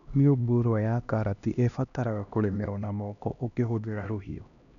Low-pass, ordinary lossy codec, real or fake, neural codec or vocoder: 7.2 kHz; none; fake; codec, 16 kHz, 1 kbps, X-Codec, HuBERT features, trained on LibriSpeech